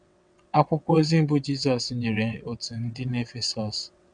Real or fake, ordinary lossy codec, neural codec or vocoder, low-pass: fake; none; vocoder, 22.05 kHz, 80 mel bands, WaveNeXt; 9.9 kHz